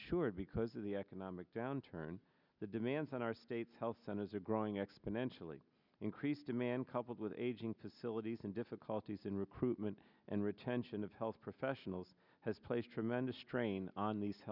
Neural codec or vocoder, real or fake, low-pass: none; real; 5.4 kHz